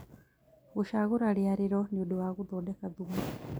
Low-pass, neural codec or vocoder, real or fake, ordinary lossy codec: none; none; real; none